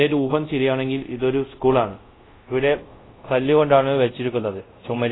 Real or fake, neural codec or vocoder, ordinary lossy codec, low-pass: fake; codec, 24 kHz, 0.5 kbps, DualCodec; AAC, 16 kbps; 7.2 kHz